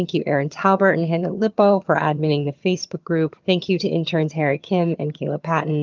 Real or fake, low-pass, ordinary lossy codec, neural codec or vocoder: fake; 7.2 kHz; Opus, 24 kbps; vocoder, 22.05 kHz, 80 mel bands, HiFi-GAN